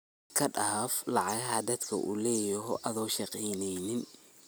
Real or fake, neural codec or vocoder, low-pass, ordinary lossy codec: real; none; none; none